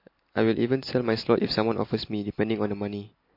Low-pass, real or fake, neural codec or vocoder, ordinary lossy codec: 5.4 kHz; real; none; MP3, 32 kbps